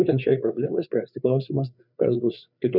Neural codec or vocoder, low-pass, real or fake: codec, 16 kHz, 4 kbps, FreqCodec, larger model; 5.4 kHz; fake